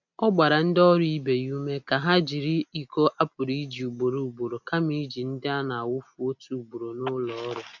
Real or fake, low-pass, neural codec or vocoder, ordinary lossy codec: real; 7.2 kHz; none; none